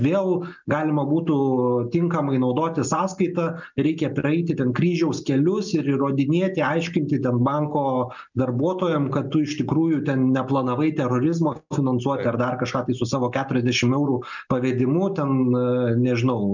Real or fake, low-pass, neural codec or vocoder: real; 7.2 kHz; none